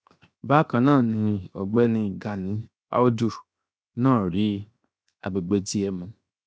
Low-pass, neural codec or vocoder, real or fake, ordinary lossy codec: none; codec, 16 kHz, 0.7 kbps, FocalCodec; fake; none